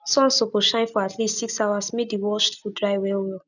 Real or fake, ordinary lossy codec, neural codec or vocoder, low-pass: real; none; none; 7.2 kHz